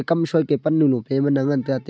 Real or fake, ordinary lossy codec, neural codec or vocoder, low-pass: real; none; none; none